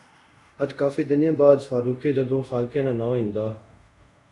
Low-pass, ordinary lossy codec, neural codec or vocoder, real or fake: 10.8 kHz; MP3, 96 kbps; codec, 24 kHz, 0.5 kbps, DualCodec; fake